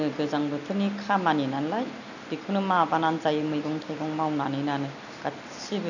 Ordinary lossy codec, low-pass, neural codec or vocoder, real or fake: none; 7.2 kHz; none; real